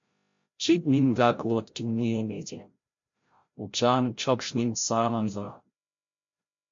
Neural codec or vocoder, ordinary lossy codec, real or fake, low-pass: codec, 16 kHz, 0.5 kbps, FreqCodec, larger model; MP3, 48 kbps; fake; 7.2 kHz